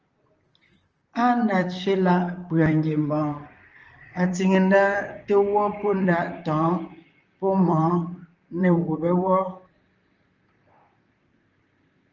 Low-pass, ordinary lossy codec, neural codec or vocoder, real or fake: 7.2 kHz; Opus, 24 kbps; vocoder, 44.1 kHz, 128 mel bands, Pupu-Vocoder; fake